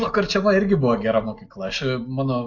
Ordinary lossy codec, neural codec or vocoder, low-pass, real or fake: AAC, 48 kbps; none; 7.2 kHz; real